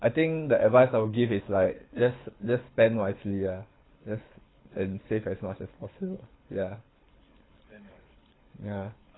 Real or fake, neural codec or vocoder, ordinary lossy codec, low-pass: fake; codec, 24 kHz, 6 kbps, HILCodec; AAC, 16 kbps; 7.2 kHz